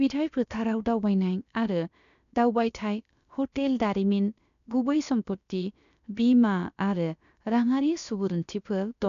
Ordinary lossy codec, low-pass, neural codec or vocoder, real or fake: none; 7.2 kHz; codec, 16 kHz, about 1 kbps, DyCAST, with the encoder's durations; fake